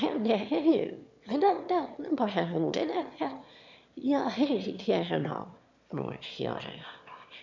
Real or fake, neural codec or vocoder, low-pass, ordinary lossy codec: fake; autoencoder, 22.05 kHz, a latent of 192 numbers a frame, VITS, trained on one speaker; 7.2 kHz; MP3, 64 kbps